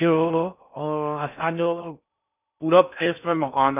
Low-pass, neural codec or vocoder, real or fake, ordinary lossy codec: 3.6 kHz; codec, 16 kHz in and 24 kHz out, 0.6 kbps, FocalCodec, streaming, 4096 codes; fake; none